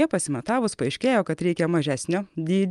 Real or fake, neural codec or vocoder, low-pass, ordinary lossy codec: real; none; 10.8 kHz; Opus, 32 kbps